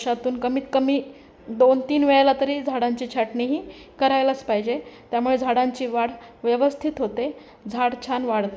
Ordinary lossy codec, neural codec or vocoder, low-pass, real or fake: none; none; none; real